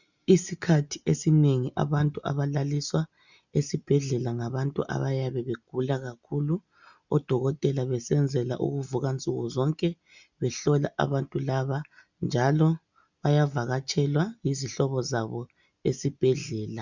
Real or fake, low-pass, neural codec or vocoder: real; 7.2 kHz; none